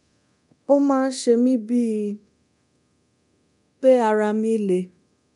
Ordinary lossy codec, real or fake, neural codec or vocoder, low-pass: none; fake; codec, 24 kHz, 0.9 kbps, DualCodec; 10.8 kHz